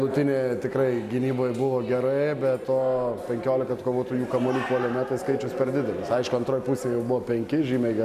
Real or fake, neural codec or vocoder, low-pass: real; none; 14.4 kHz